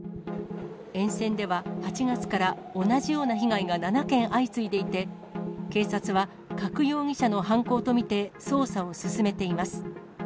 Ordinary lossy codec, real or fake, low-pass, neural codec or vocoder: none; real; none; none